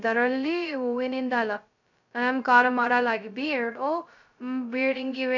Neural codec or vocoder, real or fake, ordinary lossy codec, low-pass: codec, 16 kHz, 0.2 kbps, FocalCodec; fake; none; 7.2 kHz